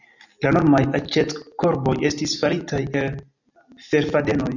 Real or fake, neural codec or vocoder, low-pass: real; none; 7.2 kHz